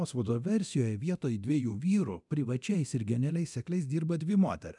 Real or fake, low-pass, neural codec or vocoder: fake; 10.8 kHz; codec, 24 kHz, 0.9 kbps, DualCodec